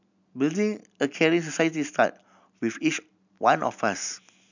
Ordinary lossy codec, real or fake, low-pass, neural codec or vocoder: none; real; 7.2 kHz; none